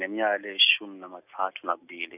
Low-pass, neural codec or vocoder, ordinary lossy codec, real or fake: 3.6 kHz; none; none; real